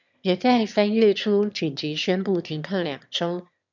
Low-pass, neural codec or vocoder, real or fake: 7.2 kHz; autoencoder, 22.05 kHz, a latent of 192 numbers a frame, VITS, trained on one speaker; fake